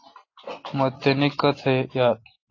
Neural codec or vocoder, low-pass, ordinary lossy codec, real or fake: none; 7.2 kHz; AAC, 32 kbps; real